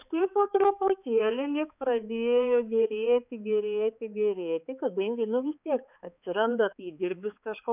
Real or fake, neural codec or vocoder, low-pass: fake; codec, 16 kHz, 4 kbps, X-Codec, HuBERT features, trained on balanced general audio; 3.6 kHz